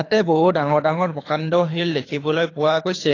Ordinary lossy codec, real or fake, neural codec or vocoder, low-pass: AAC, 32 kbps; fake; codec, 24 kHz, 3 kbps, HILCodec; 7.2 kHz